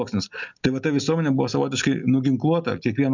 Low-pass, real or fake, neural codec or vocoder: 7.2 kHz; real; none